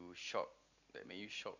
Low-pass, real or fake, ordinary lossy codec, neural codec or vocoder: 7.2 kHz; real; none; none